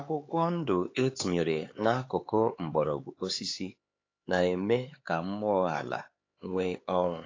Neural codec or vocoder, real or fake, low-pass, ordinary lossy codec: codec, 16 kHz, 4 kbps, X-Codec, HuBERT features, trained on LibriSpeech; fake; 7.2 kHz; AAC, 32 kbps